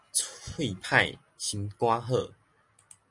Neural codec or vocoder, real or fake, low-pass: none; real; 10.8 kHz